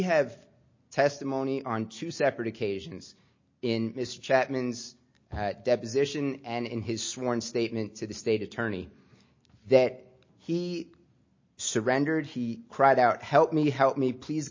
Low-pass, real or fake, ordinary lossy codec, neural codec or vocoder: 7.2 kHz; real; MP3, 32 kbps; none